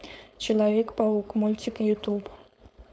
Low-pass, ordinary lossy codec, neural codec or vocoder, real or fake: none; none; codec, 16 kHz, 4.8 kbps, FACodec; fake